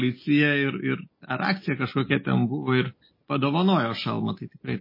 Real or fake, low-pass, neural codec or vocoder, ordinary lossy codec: real; 5.4 kHz; none; MP3, 24 kbps